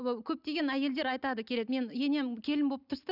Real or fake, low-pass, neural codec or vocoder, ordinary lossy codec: real; 5.4 kHz; none; none